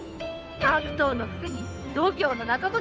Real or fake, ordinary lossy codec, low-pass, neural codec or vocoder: fake; none; none; codec, 16 kHz, 2 kbps, FunCodec, trained on Chinese and English, 25 frames a second